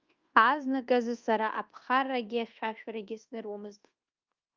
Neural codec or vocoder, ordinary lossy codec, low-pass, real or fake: codec, 24 kHz, 1.2 kbps, DualCodec; Opus, 32 kbps; 7.2 kHz; fake